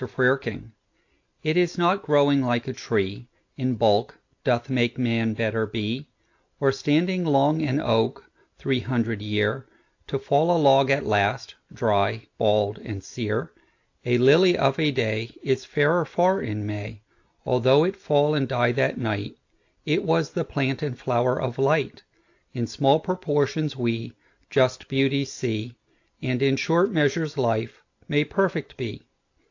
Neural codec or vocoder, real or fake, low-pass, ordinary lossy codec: none; real; 7.2 kHz; AAC, 48 kbps